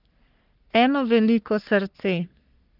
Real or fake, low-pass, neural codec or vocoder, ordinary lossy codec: fake; 5.4 kHz; codec, 44.1 kHz, 3.4 kbps, Pupu-Codec; Opus, 24 kbps